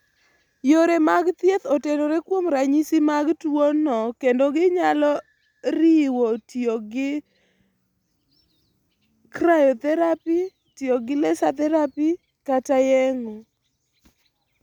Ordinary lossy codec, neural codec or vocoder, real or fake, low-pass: none; none; real; 19.8 kHz